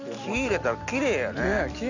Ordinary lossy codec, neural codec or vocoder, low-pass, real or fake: none; none; 7.2 kHz; real